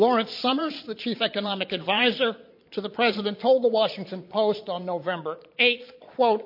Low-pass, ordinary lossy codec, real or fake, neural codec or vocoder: 5.4 kHz; MP3, 32 kbps; fake; vocoder, 22.05 kHz, 80 mel bands, Vocos